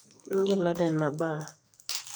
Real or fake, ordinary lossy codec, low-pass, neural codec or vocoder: fake; none; none; codec, 44.1 kHz, 2.6 kbps, SNAC